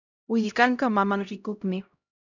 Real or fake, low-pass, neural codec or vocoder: fake; 7.2 kHz; codec, 16 kHz, 0.5 kbps, X-Codec, HuBERT features, trained on LibriSpeech